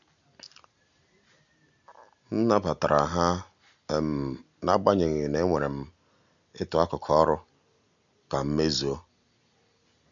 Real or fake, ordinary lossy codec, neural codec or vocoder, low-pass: real; none; none; 7.2 kHz